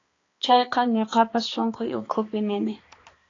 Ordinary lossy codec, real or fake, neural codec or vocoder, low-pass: AAC, 32 kbps; fake; codec, 16 kHz, 2 kbps, X-Codec, HuBERT features, trained on balanced general audio; 7.2 kHz